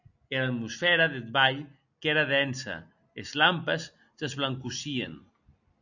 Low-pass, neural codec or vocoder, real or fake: 7.2 kHz; none; real